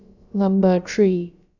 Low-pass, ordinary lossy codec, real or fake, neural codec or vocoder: 7.2 kHz; none; fake; codec, 16 kHz, about 1 kbps, DyCAST, with the encoder's durations